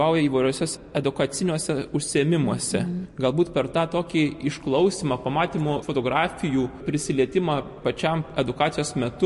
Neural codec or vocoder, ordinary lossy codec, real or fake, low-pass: none; MP3, 48 kbps; real; 14.4 kHz